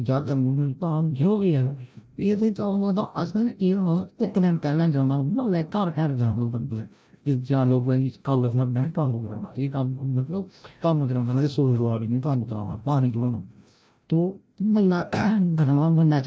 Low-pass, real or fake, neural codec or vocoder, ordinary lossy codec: none; fake; codec, 16 kHz, 0.5 kbps, FreqCodec, larger model; none